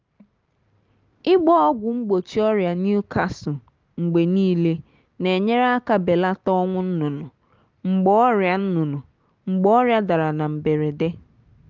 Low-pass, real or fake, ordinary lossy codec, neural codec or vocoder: 7.2 kHz; real; Opus, 24 kbps; none